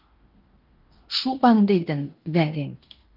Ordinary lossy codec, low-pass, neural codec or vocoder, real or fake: Opus, 32 kbps; 5.4 kHz; codec, 16 kHz in and 24 kHz out, 0.9 kbps, LongCat-Audio-Codec, fine tuned four codebook decoder; fake